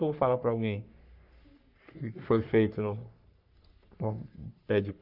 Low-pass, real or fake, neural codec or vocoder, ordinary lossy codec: 5.4 kHz; fake; codec, 44.1 kHz, 3.4 kbps, Pupu-Codec; none